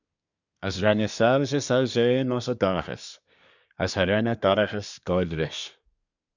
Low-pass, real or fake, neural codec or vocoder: 7.2 kHz; fake; codec, 24 kHz, 1 kbps, SNAC